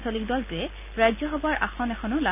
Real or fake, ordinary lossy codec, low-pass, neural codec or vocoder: real; none; 3.6 kHz; none